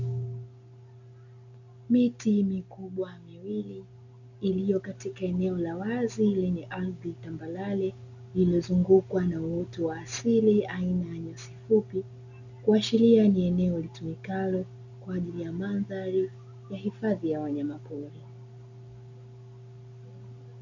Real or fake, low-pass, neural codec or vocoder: real; 7.2 kHz; none